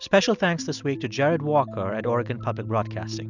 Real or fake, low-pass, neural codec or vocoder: real; 7.2 kHz; none